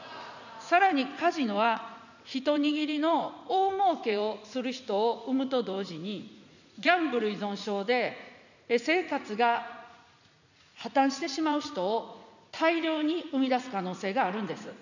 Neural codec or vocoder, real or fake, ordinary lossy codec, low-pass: vocoder, 44.1 kHz, 80 mel bands, Vocos; fake; none; 7.2 kHz